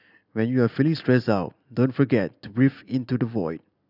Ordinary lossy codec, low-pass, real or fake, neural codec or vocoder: none; 5.4 kHz; real; none